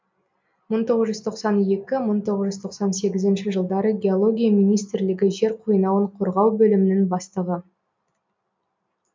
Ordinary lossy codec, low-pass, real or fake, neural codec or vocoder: MP3, 64 kbps; 7.2 kHz; real; none